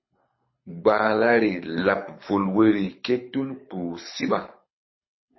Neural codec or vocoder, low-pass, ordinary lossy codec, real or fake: codec, 24 kHz, 6 kbps, HILCodec; 7.2 kHz; MP3, 24 kbps; fake